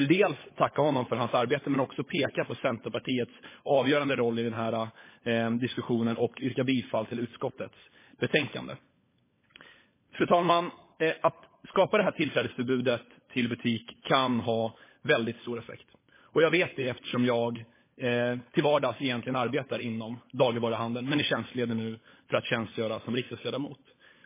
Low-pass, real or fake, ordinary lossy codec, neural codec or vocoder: 3.6 kHz; fake; MP3, 16 kbps; codec, 16 kHz, 16 kbps, FunCodec, trained on LibriTTS, 50 frames a second